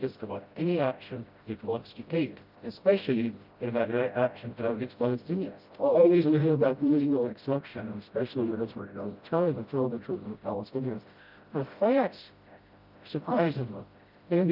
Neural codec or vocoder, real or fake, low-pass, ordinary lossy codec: codec, 16 kHz, 0.5 kbps, FreqCodec, smaller model; fake; 5.4 kHz; Opus, 24 kbps